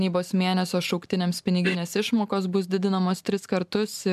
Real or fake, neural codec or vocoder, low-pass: real; none; 14.4 kHz